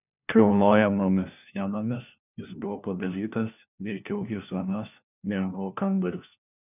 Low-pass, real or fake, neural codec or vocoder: 3.6 kHz; fake; codec, 16 kHz, 1 kbps, FunCodec, trained on LibriTTS, 50 frames a second